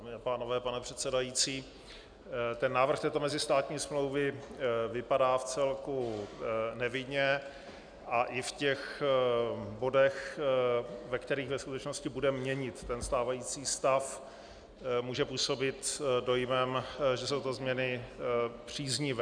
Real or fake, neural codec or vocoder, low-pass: real; none; 9.9 kHz